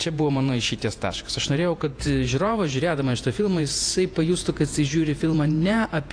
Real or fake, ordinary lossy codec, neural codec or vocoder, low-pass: fake; AAC, 48 kbps; vocoder, 48 kHz, 128 mel bands, Vocos; 9.9 kHz